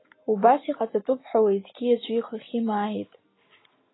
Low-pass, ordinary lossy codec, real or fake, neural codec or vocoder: 7.2 kHz; AAC, 16 kbps; real; none